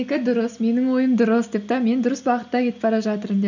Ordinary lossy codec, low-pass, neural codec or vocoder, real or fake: none; 7.2 kHz; none; real